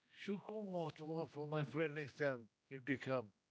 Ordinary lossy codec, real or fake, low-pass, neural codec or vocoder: none; fake; none; codec, 16 kHz, 1 kbps, X-Codec, HuBERT features, trained on general audio